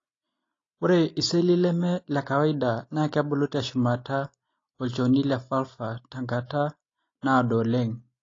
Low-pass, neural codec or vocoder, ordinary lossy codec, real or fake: 7.2 kHz; none; AAC, 32 kbps; real